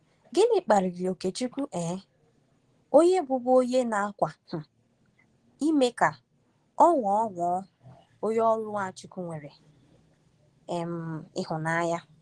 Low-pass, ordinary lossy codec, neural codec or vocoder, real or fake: 10.8 kHz; Opus, 16 kbps; none; real